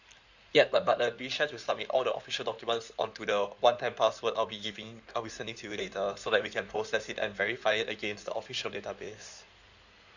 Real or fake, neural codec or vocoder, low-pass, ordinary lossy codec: fake; codec, 16 kHz in and 24 kHz out, 2.2 kbps, FireRedTTS-2 codec; 7.2 kHz; none